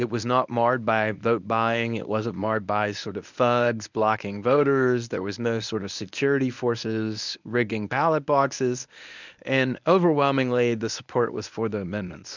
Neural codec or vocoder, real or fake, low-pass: codec, 24 kHz, 0.9 kbps, WavTokenizer, medium speech release version 1; fake; 7.2 kHz